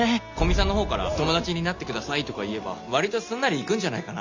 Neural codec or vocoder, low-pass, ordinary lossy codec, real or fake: none; 7.2 kHz; Opus, 64 kbps; real